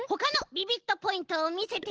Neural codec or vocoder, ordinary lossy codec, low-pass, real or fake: none; Opus, 16 kbps; 7.2 kHz; real